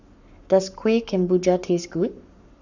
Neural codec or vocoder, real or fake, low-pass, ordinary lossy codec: codec, 44.1 kHz, 7.8 kbps, Pupu-Codec; fake; 7.2 kHz; none